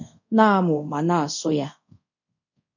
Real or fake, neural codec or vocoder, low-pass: fake; codec, 24 kHz, 0.5 kbps, DualCodec; 7.2 kHz